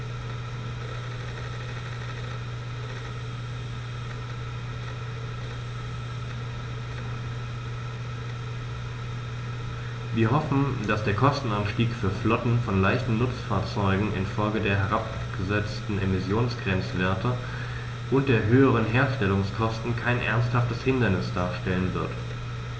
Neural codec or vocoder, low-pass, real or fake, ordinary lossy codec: none; none; real; none